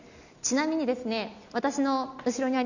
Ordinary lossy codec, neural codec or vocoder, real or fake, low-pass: none; none; real; 7.2 kHz